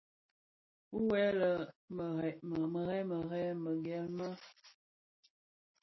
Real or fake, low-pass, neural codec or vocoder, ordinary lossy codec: real; 7.2 kHz; none; MP3, 24 kbps